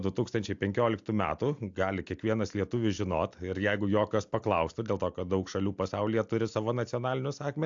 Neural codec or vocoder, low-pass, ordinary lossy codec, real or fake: none; 7.2 kHz; AAC, 64 kbps; real